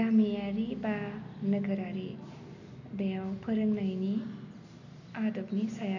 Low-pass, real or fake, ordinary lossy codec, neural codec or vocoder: 7.2 kHz; real; none; none